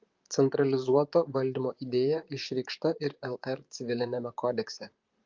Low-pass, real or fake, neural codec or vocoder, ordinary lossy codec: 7.2 kHz; fake; vocoder, 44.1 kHz, 128 mel bands, Pupu-Vocoder; Opus, 24 kbps